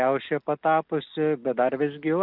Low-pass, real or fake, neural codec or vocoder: 5.4 kHz; real; none